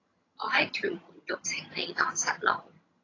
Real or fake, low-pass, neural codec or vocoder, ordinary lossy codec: fake; 7.2 kHz; vocoder, 22.05 kHz, 80 mel bands, HiFi-GAN; AAC, 32 kbps